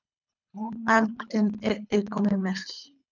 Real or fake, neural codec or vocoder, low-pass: fake; codec, 24 kHz, 3 kbps, HILCodec; 7.2 kHz